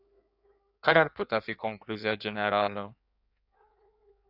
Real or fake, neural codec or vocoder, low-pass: fake; codec, 16 kHz in and 24 kHz out, 1.1 kbps, FireRedTTS-2 codec; 5.4 kHz